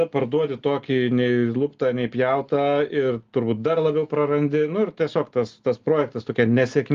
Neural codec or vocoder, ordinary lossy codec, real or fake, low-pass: none; Opus, 32 kbps; real; 7.2 kHz